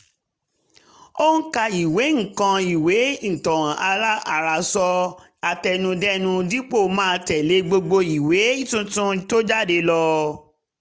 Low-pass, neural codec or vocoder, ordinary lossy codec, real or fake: none; none; none; real